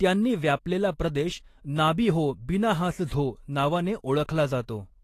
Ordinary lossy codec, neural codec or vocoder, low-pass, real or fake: AAC, 48 kbps; autoencoder, 48 kHz, 128 numbers a frame, DAC-VAE, trained on Japanese speech; 14.4 kHz; fake